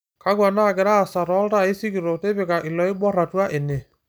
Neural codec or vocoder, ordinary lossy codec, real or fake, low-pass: none; none; real; none